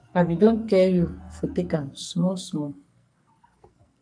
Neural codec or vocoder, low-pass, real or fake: codec, 44.1 kHz, 2.6 kbps, SNAC; 9.9 kHz; fake